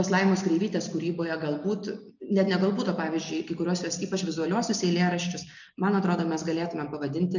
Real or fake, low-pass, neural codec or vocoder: real; 7.2 kHz; none